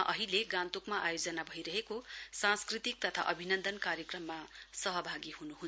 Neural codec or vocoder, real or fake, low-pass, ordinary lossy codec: none; real; none; none